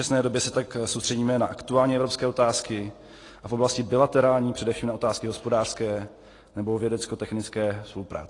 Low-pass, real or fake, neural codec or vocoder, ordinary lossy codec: 10.8 kHz; real; none; AAC, 32 kbps